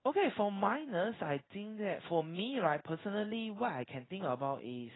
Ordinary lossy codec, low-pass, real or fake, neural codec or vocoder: AAC, 16 kbps; 7.2 kHz; fake; codec, 16 kHz in and 24 kHz out, 1 kbps, XY-Tokenizer